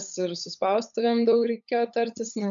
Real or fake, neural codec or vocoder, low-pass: fake; codec, 16 kHz, 8 kbps, FunCodec, trained on LibriTTS, 25 frames a second; 7.2 kHz